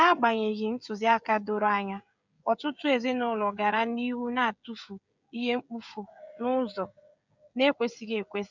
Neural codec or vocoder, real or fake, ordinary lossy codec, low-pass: codec, 16 kHz, 16 kbps, FreqCodec, smaller model; fake; none; 7.2 kHz